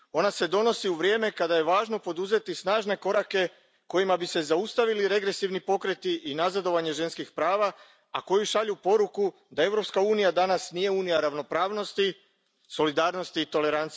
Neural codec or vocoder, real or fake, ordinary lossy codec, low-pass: none; real; none; none